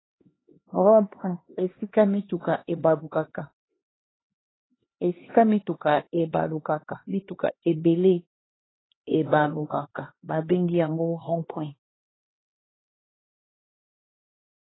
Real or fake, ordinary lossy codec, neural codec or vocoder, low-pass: fake; AAC, 16 kbps; codec, 16 kHz, 2 kbps, X-Codec, HuBERT features, trained on LibriSpeech; 7.2 kHz